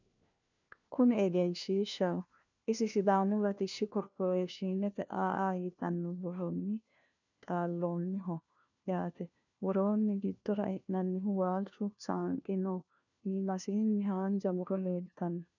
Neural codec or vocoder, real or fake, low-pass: codec, 16 kHz, 1 kbps, FunCodec, trained on LibriTTS, 50 frames a second; fake; 7.2 kHz